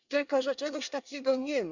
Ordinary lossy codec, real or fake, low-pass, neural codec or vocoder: none; fake; 7.2 kHz; codec, 24 kHz, 1 kbps, SNAC